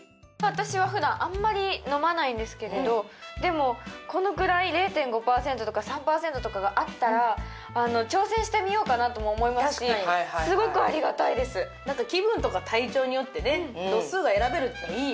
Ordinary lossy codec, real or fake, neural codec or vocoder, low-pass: none; real; none; none